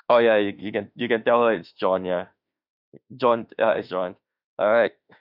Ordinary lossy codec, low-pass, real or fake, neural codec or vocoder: none; 5.4 kHz; fake; autoencoder, 48 kHz, 32 numbers a frame, DAC-VAE, trained on Japanese speech